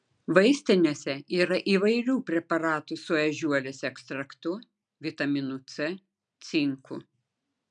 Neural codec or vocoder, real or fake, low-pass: none; real; 9.9 kHz